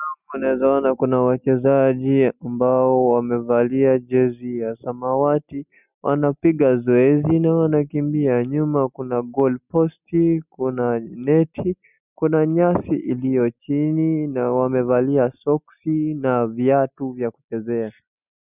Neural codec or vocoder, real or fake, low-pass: none; real; 3.6 kHz